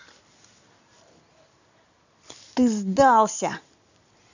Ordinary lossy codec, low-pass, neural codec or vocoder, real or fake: none; 7.2 kHz; vocoder, 44.1 kHz, 128 mel bands every 256 samples, BigVGAN v2; fake